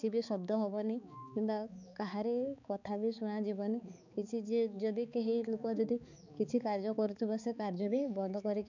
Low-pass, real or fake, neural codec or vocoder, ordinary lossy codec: 7.2 kHz; fake; codec, 16 kHz, 4 kbps, X-Codec, HuBERT features, trained on balanced general audio; none